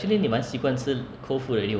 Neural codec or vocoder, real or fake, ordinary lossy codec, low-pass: none; real; none; none